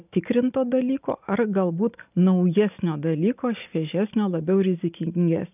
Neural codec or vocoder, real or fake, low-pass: none; real; 3.6 kHz